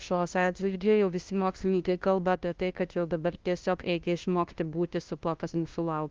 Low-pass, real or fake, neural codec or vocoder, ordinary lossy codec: 7.2 kHz; fake; codec, 16 kHz, 0.5 kbps, FunCodec, trained on LibriTTS, 25 frames a second; Opus, 32 kbps